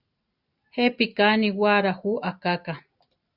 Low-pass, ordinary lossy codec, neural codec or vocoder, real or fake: 5.4 kHz; Opus, 64 kbps; none; real